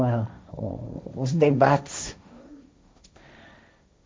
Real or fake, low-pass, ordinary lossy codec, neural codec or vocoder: fake; none; none; codec, 16 kHz, 1.1 kbps, Voila-Tokenizer